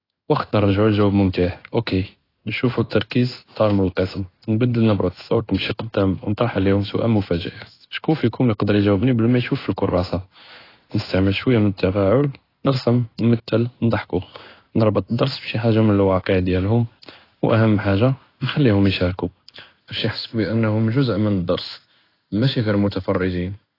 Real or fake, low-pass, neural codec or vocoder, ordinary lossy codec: fake; 5.4 kHz; codec, 16 kHz in and 24 kHz out, 1 kbps, XY-Tokenizer; AAC, 24 kbps